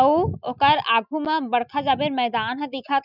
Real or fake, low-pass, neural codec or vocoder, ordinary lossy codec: real; 5.4 kHz; none; none